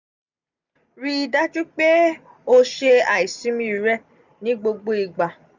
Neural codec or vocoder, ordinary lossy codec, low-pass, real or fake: none; none; 7.2 kHz; real